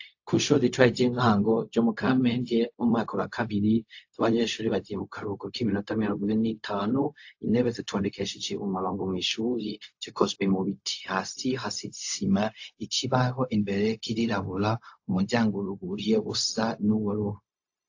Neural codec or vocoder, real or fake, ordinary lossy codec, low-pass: codec, 16 kHz, 0.4 kbps, LongCat-Audio-Codec; fake; AAC, 48 kbps; 7.2 kHz